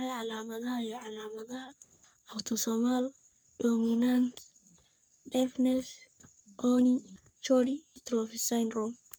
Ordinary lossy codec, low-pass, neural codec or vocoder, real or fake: none; none; codec, 44.1 kHz, 3.4 kbps, Pupu-Codec; fake